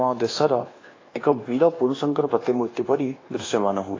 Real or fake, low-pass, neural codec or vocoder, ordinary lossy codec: fake; 7.2 kHz; codec, 24 kHz, 1.2 kbps, DualCodec; AAC, 32 kbps